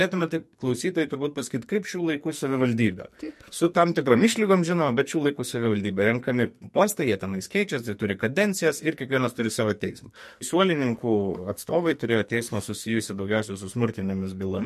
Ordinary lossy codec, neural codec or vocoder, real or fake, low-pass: MP3, 64 kbps; codec, 44.1 kHz, 2.6 kbps, SNAC; fake; 14.4 kHz